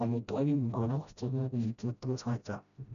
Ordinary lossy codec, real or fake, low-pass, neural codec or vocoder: none; fake; 7.2 kHz; codec, 16 kHz, 0.5 kbps, FreqCodec, smaller model